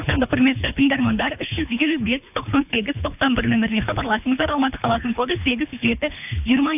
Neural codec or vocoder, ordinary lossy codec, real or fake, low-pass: codec, 24 kHz, 3 kbps, HILCodec; AAC, 32 kbps; fake; 3.6 kHz